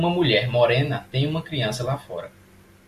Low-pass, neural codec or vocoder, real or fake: 14.4 kHz; none; real